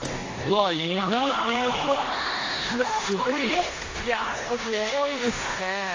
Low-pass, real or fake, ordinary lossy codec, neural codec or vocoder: 7.2 kHz; fake; AAC, 32 kbps; codec, 16 kHz in and 24 kHz out, 0.9 kbps, LongCat-Audio-Codec, four codebook decoder